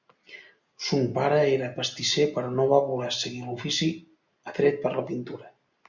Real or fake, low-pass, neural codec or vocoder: real; 7.2 kHz; none